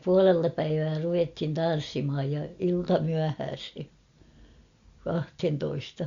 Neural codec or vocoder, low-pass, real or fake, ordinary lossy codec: none; 7.2 kHz; real; Opus, 64 kbps